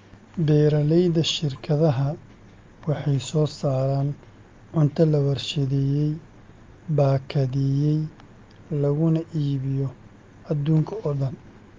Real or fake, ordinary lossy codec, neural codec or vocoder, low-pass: real; Opus, 32 kbps; none; 7.2 kHz